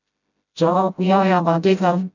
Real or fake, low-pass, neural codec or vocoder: fake; 7.2 kHz; codec, 16 kHz, 0.5 kbps, FreqCodec, smaller model